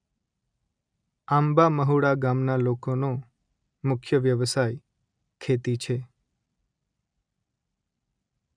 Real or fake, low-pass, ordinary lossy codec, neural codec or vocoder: real; 9.9 kHz; none; none